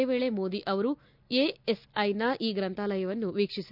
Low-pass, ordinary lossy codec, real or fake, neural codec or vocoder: 5.4 kHz; none; real; none